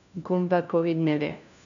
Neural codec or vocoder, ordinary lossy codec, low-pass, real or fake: codec, 16 kHz, 1 kbps, FunCodec, trained on LibriTTS, 50 frames a second; none; 7.2 kHz; fake